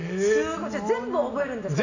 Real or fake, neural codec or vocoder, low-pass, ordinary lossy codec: real; none; 7.2 kHz; none